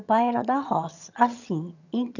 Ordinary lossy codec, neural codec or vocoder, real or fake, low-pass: none; vocoder, 22.05 kHz, 80 mel bands, HiFi-GAN; fake; 7.2 kHz